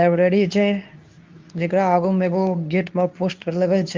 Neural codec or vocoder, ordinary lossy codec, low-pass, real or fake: codec, 24 kHz, 0.9 kbps, WavTokenizer, medium speech release version 2; Opus, 24 kbps; 7.2 kHz; fake